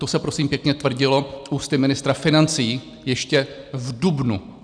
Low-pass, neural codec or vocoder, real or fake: 9.9 kHz; none; real